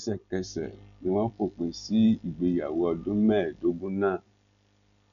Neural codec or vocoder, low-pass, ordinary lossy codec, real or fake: none; 7.2 kHz; none; real